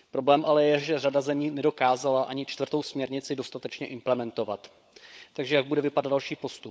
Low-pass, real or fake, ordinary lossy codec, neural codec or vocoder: none; fake; none; codec, 16 kHz, 16 kbps, FunCodec, trained on LibriTTS, 50 frames a second